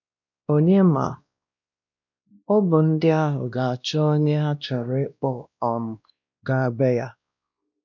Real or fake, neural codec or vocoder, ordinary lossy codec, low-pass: fake; codec, 16 kHz, 1 kbps, X-Codec, WavLM features, trained on Multilingual LibriSpeech; none; 7.2 kHz